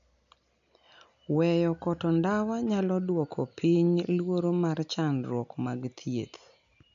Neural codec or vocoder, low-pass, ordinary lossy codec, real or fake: none; 7.2 kHz; none; real